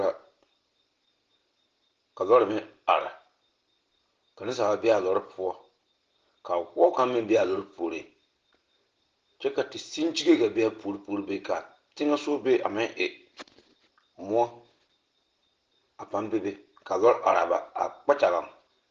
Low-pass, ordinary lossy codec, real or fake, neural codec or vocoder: 7.2 kHz; Opus, 16 kbps; real; none